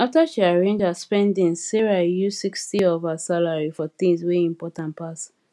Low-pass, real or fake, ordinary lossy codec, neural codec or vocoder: none; real; none; none